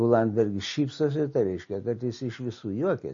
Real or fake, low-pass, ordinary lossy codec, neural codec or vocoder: real; 7.2 kHz; MP3, 32 kbps; none